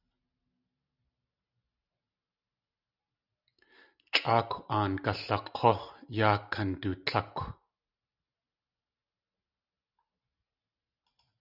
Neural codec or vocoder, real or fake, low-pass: none; real; 5.4 kHz